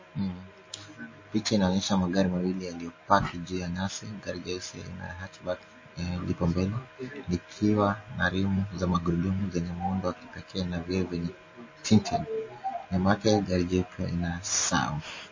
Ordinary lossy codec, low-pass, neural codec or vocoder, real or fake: MP3, 32 kbps; 7.2 kHz; none; real